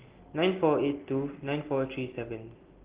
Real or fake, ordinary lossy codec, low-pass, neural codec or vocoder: real; Opus, 16 kbps; 3.6 kHz; none